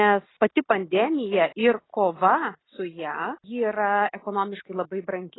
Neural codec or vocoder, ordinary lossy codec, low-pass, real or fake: none; AAC, 16 kbps; 7.2 kHz; real